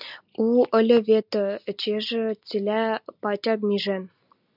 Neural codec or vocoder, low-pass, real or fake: none; 5.4 kHz; real